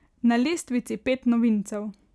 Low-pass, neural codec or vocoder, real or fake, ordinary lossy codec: none; none; real; none